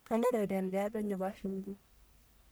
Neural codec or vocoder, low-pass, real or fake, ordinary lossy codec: codec, 44.1 kHz, 1.7 kbps, Pupu-Codec; none; fake; none